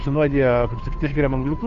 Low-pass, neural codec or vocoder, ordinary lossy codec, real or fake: 7.2 kHz; codec, 16 kHz, 2 kbps, FunCodec, trained on Chinese and English, 25 frames a second; MP3, 48 kbps; fake